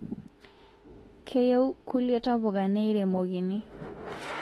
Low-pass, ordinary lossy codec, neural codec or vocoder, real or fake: 19.8 kHz; AAC, 32 kbps; autoencoder, 48 kHz, 32 numbers a frame, DAC-VAE, trained on Japanese speech; fake